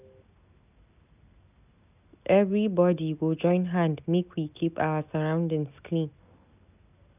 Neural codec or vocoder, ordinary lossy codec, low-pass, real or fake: none; none; 3.6 kHz; real